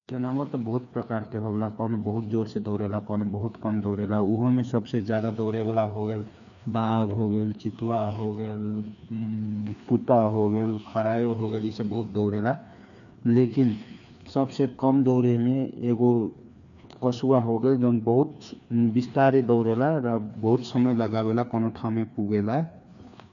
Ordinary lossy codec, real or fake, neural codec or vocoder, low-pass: none; fake; codec, 16 kHz, 2 kbps, FreqCodec, larger model; 7.2 kHz